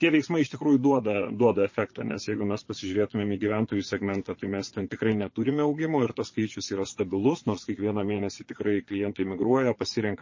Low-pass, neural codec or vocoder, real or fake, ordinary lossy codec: 7.2 kHz; vocoder, 22.05 kHz, 80 mel bands, Vocos; fake; MP3, 32 kbps